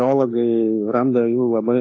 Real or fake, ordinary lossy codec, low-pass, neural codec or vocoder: fake; AAC, 32 kbps; 7.2 kHz; autoencoder, 48 kHz, 32 numbers a frame, DAC-VAE, trained on Japanese speech